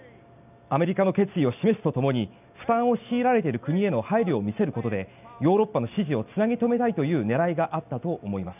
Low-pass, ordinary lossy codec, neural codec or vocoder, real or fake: 3.6 kHz; none; none; real